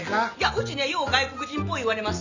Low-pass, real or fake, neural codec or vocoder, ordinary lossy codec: 7.2 kHz; real; none; none